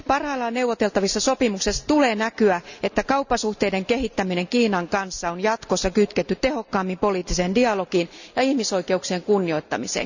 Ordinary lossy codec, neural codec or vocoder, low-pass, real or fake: none; none; 7.2 kHz; real